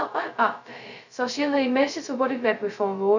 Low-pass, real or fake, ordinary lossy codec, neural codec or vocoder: 7.2 kHz; fake; none; codec, 16 kHz, 0.2 kbps, FocalCodec